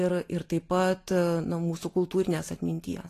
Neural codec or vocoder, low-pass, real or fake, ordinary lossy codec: none; 14.4 kHz; real; AAC, 48 kbps